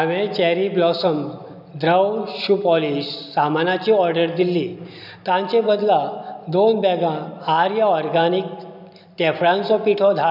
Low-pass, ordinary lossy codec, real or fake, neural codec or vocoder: 5.4 kHz; none; real; none